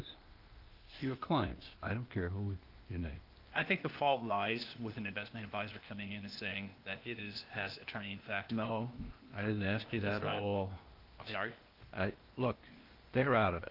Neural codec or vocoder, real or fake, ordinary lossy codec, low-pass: codec, 16 kHz, 0.8 kbps, ZipCodec; fake; Opus, 32 kbps; 5.4 kHz